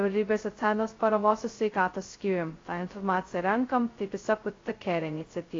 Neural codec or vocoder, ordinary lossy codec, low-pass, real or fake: codec, 16 kHz, 0.2 kbps, FocalCodec; AAC, 32 kbps; 7.2 kHz; fake